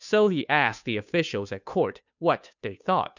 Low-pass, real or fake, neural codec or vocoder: 7.2 kHz; fake; codec, 16 kHz, 2 kbps, FunCodec, trained on Chinese and English, 25 frames a second